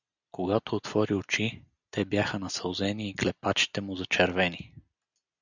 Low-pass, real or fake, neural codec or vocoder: 7.2 kHz; real; none